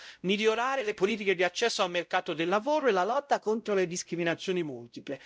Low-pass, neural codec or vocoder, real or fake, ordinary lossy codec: none; codec, 16 kHz, 0.5 kbps, X-Codec, WavLM features, trained on Multilingual LibriSpeech; fake; none